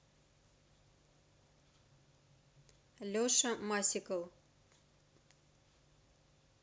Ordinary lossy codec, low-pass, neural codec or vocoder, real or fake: none; none; none; real